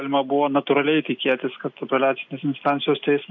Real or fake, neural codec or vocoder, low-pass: real; none; 7.2 kHz